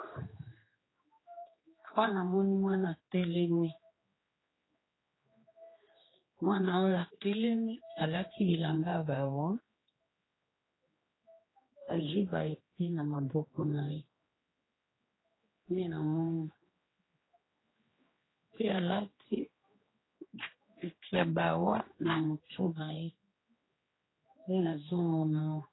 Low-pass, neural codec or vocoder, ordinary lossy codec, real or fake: 7.2 kHz; codec, 16 kHz, 2 kbps, X-Codec, HuBERT features, trained on general audio; AAC, 16 kbps; fake